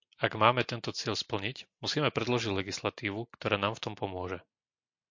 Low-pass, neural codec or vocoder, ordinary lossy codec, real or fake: 7.2 kHz; none; MP3, 48 kbps; real